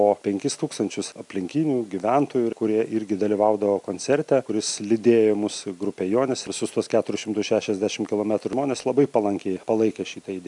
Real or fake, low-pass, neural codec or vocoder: real; 10.8 kHz; none